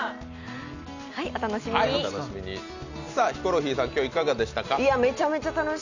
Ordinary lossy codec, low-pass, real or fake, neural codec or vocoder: none; 7.2 kHz; real; none